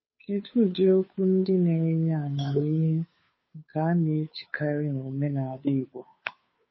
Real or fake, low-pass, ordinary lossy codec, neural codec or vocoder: fake; 7.2 kHz; MP3, 24 kbps; codec, 16 kHz, 2 kbps, FunCodec, trained on Chinese and English, 25 frames a second